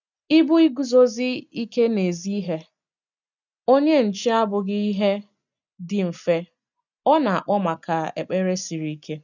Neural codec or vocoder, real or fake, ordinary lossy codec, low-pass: none; real; none; 7.2 kHz